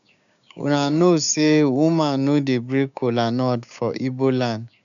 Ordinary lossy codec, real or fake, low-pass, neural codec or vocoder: none; fake; 7.2 kHz; codec, 16 kHz, 6 kbps, DAC